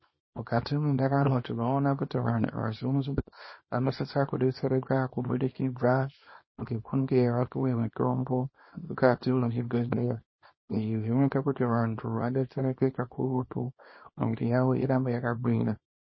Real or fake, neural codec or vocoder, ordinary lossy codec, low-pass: fake; codec, 24 kHz, 0.9 kbps, WavTokenizer, small release; MP3, 24 kbps; 7.2 kHz